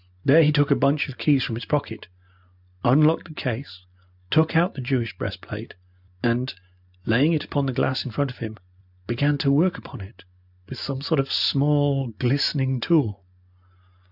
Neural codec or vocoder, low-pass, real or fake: none; 5.4 kHz; real